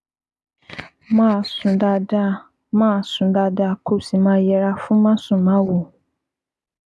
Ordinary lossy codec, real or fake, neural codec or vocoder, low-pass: none; real; none; none